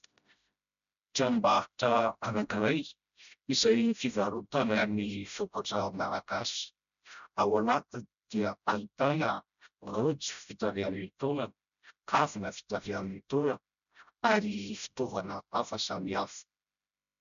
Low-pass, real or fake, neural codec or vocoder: 7.2 kHz; fake; codec, 16 kHz, 0.5 kbps, FreqCodec, smaller model